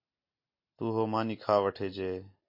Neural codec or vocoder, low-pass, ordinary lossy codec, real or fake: none; 5.4 kHz; MP3, 32 kbps; real